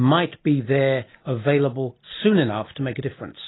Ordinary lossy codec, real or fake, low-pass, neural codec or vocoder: AAC, 16 kbps; real; 7.2 kHz; none